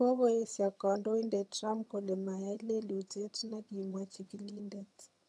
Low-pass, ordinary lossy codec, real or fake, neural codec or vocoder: none; none; fake; vocoder, 22.05 kHz, 80 mel bands, HiFi-GAN